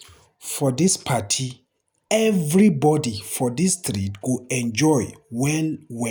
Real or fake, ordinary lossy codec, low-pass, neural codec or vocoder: real; none; none; none